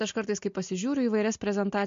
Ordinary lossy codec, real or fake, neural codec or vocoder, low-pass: MP3, 48 kbps; real; none; 7.2 kHz